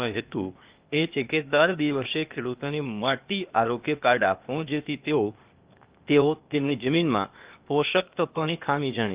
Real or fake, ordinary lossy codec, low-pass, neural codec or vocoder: fake; Opus, 32 kbps; 3.6 kHz; codec, 16 kHz, 0.8 kbps, ZipCodec